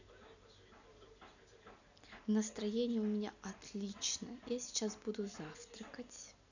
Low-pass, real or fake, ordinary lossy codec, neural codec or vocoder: 7.2 kHz; real; none; none